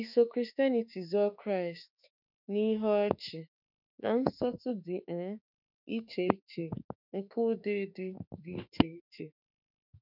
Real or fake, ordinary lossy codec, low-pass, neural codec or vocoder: fake; none; 5.4 kHz; autoencoder, 48 kHz, 32 numbers a frame, DAC-VAE, trained on Japanese speech